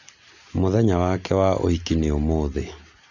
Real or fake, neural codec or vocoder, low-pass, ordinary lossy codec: real; none; 7.2 kHz; none